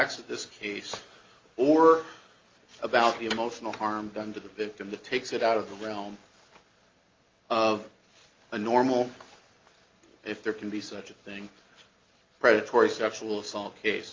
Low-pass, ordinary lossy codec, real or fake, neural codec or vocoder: 7.2 kHz; Opus, 32 kbps; real; none